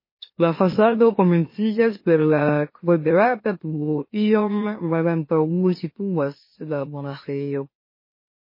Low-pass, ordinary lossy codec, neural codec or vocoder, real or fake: 5.4 kHz; MP3, 24 kbps; autoencoder, 44.1 kHz, a latent of 192 numbers a frame, MeloTTS; fake